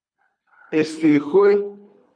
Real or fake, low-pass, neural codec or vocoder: fake; 9.9 kHz; codec, 24 kHz, 3 kbps, HILCodec